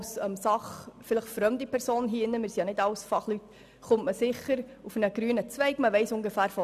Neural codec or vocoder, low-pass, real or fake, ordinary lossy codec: none; 14.4 kHz; real; none